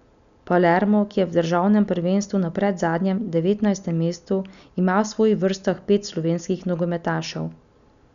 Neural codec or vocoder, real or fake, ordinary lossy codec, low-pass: none; real; none; 7.2 kHz